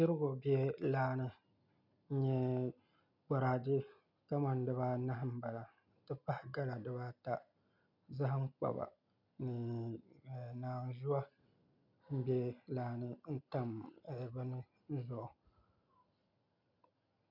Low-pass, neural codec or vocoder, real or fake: 5.4 kHz; none; real